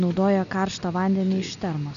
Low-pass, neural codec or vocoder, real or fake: 7.2 kHz; none; real